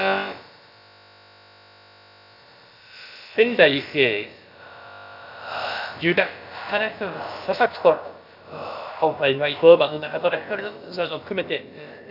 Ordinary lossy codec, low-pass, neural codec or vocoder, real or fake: none; 5.4 kHz; codec, 16 kHz, about 1 kbps, DyCAST, with the encoder's durations; fake